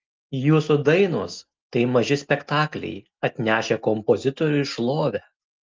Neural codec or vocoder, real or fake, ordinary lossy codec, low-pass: none; real; Opus, 32 kbps; 7.2 kHz